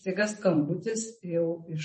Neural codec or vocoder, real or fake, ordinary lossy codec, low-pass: vocoder, 44.1 kHz, 128 mel bands every 512 samples, BigVGAN v2; fake; MP3, 32 kbps; 10.8 kHz